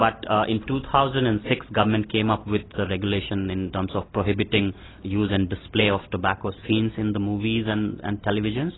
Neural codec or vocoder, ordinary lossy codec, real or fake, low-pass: none; AAC, 16 kbps; real; 7.2 kHz